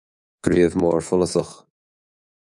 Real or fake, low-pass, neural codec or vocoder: fake; 10.8 kHz; codec, 24 kHz, 3.1 kbps, DualCodec